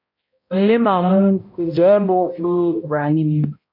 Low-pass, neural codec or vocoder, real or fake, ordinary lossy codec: 5.4 kHz; codec, 16 kHz, 0.5 kbps, X-Codec, HuBERT features, trained on balanced general audio; fake; MP3, 32 kbps